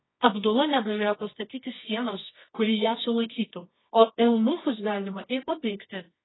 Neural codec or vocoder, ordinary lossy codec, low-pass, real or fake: codec, 24 kHz, 0.9 kbps, WavTokenizer, medium music audio release; AAC, 16 kbps; 7.2 kHz; fake